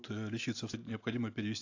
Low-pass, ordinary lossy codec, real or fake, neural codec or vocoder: 7.2 kHz; AAC, 48 kbps; real; none